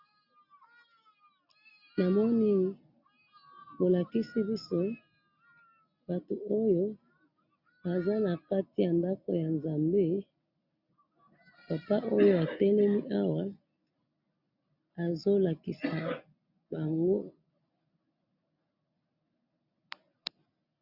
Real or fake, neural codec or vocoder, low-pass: real; none; 5.4 kHz